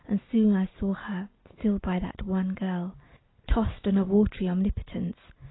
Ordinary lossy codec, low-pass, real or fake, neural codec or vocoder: AAC, 16 kbps; 7.2 kHz; real; none